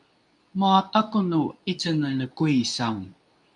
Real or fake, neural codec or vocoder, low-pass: fake; codec, 24 kHz, 0.9 kbps, WavTokenizer, medium speech release version 2; 10.8 kHz